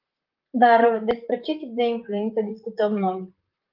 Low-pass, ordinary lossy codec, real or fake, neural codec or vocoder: 5.4 kHz; Opus, 32 kbps; fake; vocoder, 44.1 kHz, 128 mel bands, Pupu-Vocoder